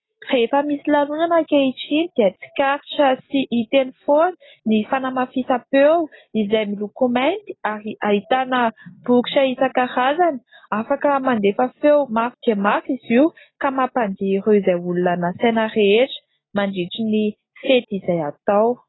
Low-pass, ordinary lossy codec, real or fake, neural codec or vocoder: 7.2 kHz; AAC, 16 kbps; real; none